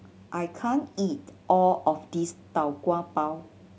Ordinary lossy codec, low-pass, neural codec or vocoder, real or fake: none; none; none; real